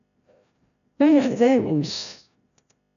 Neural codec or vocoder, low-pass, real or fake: codec, 16 kHz, 0.5 kbps, FreqCodec, larger model; 7.2 kHz; fake